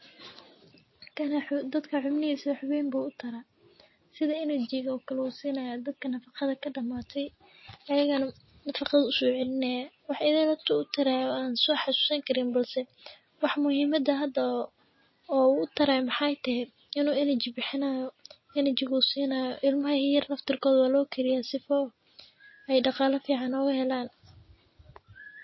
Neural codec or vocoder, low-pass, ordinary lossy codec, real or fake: none; 7.2 kHz; MP3, 24 kbps; real